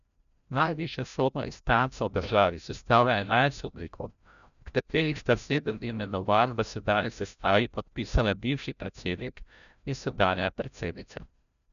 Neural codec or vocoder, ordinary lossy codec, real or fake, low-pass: codec, 16 kHz, 0.5 kbps, FreqCodec, larger model; none; fake; 7.2 kHz